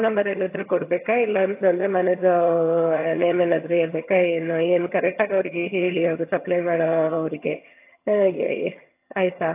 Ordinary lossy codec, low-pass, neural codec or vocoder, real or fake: AAC, 24 kbps; 3.6 kHz; vocoder, 22.05 kHz, 80 mel bands, HiFi-GAN; fake